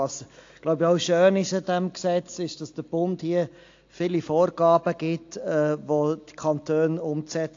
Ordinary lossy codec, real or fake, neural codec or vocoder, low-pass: AAC, 48 kbps; real; none; 7.2 kHz